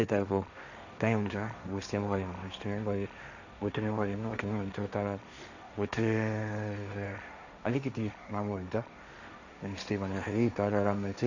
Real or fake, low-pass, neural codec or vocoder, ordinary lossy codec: fake; 7.2 kHz; codec, 16 kHz, 1.1 kbps, Voila-Tokenizer; none